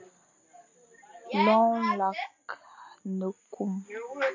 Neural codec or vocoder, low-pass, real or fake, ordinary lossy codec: none; 7.2 kHz; real; AAC, 48 kbps